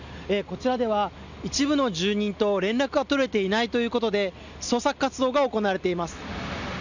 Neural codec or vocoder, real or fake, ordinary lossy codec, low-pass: none; real; none; 7.2 kHz